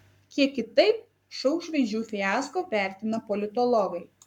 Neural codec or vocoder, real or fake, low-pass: codec, 44.1 kHz, 7.8 kbps, Pupu-Codec; fake; 19.8 kHz